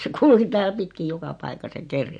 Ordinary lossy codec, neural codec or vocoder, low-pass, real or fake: MP3, 48 kbps; none; 9.9 kHz; real